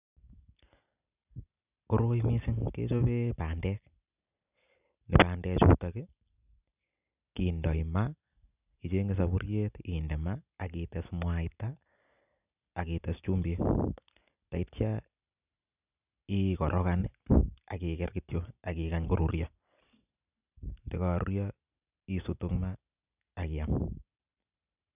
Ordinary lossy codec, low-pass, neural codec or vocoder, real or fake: none; 3.6 kHz; none; real